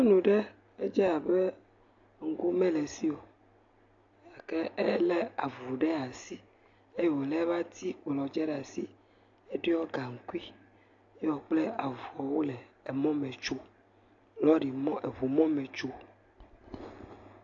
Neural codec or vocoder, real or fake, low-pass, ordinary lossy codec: none; real; 7.2 kHz; AAC, 48 kbps